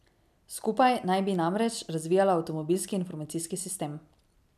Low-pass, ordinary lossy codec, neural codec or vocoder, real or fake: 14.4 kHz; none; none; real